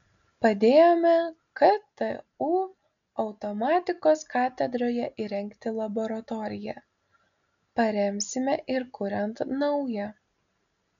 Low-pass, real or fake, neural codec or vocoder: 7.2 kHz; real; none